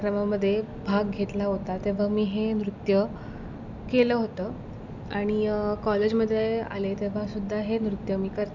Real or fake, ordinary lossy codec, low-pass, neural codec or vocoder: real; none; 7.2 kHz; none